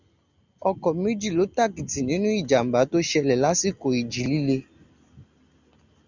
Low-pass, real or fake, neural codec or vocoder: 7.2 kHz; real; none